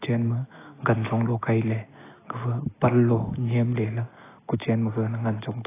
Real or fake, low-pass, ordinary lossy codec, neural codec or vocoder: real; 3.6 kHz; AAC, 16 kbps; none